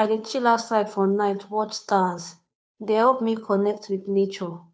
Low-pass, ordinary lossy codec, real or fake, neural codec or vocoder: none; none; fake; codec, 16 kHz, 2 kbps, FunCodec, trained on Chinese and English, 25 frames a second